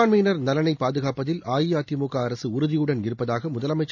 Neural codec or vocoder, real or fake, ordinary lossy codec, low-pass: none; real; none; 7.2 kHz